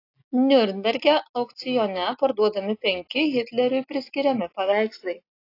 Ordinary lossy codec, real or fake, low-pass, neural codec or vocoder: AAC, 32 kbps; real; 5.4 kHz; none